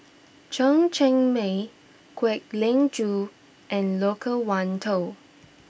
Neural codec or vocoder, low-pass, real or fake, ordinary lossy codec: none; none; real; none